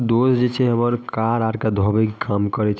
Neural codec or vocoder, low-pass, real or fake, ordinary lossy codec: none; none; real; none